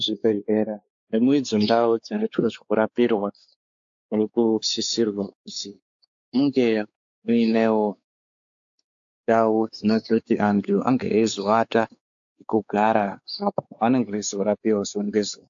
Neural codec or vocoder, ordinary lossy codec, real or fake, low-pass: codec, 16 kHz, 4 kbps, X-Codec, WavLM features, trained on Multilingual LibriSpeech; AAC, 64 kbps; fake; 7.2 kHz